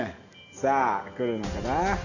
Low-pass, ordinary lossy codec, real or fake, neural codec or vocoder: 7.2 kHz; none; real; none